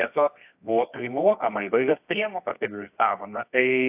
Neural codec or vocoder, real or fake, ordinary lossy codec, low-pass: codec, 24 kHz, 0.9 kbps, WavTokenizer, medium music audio release; fake; AAC, 32 kbps; 3.6 kHz